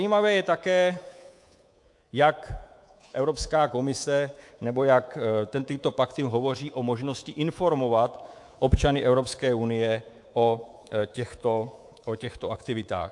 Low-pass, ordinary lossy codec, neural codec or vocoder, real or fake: 10.8 kHz; AAC, 64 kbps; codec, 24 kHz, 3.1 kbps, DualCodec; fake